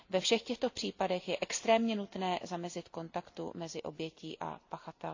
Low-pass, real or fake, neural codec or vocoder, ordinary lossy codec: 7.2 kHz; real; none; AAC, 48 kbps